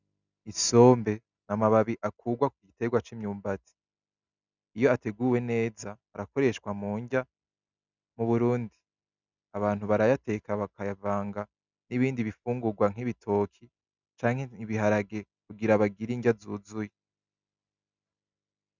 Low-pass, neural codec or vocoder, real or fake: 7.2 kHz; none; real